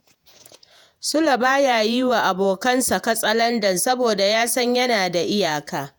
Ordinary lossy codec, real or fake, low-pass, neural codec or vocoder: none; fake; none; vocoder, 48 kHz, 128 mel bands, Vocos